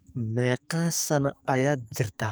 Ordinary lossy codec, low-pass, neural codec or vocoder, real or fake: none; none; codec, 44.1 kHz, 2.6 kbps, SNAC; fake